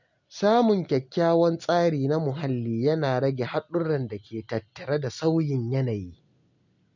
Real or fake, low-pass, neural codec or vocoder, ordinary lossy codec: real; 7.2 kHz; none; none